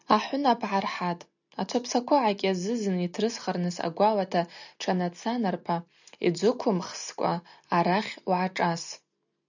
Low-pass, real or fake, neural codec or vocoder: 7.2 kHz; real; none